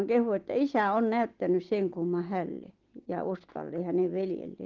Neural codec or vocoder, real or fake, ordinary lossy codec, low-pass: none; real; Opus, 16 kbps; 7.2 kHz